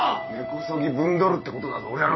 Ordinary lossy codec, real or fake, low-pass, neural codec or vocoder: MP3, 24 kbps; fake; 7.2 kHz; vocoder, 44.1 kHz, 128 mel bands every 256 samples, BigVGAN v2